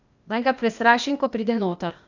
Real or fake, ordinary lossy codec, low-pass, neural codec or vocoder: fake; none; 7.2 kHz; codec, 16 kHz, 0.8 kbps, ZipCodec